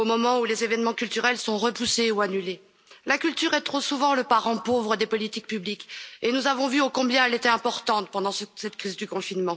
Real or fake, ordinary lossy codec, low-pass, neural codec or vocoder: real; none; none; none